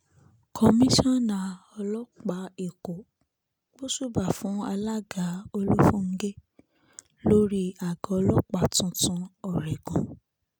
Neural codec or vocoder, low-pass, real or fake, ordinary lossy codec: none; none; real; none